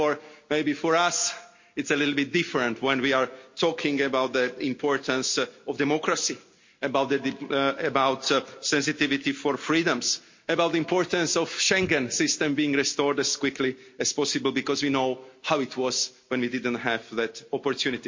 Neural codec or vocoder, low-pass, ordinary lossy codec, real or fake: none; 7.2 kHz; MP3, 48 kbps; real